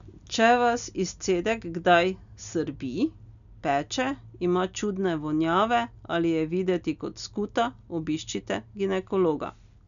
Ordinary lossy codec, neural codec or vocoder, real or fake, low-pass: none; none; real; 7.2 kHz